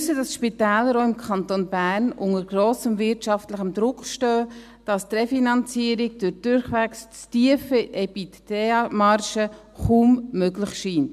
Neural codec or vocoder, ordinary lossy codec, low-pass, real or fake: none; MP3, 96 kbps; 14.4 kHz; real